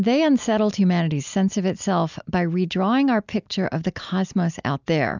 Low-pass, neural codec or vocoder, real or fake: 7.2 kHz; none; real